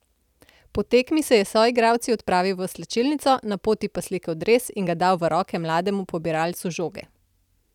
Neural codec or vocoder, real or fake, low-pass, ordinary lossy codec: none; real; 19.8 kHz; none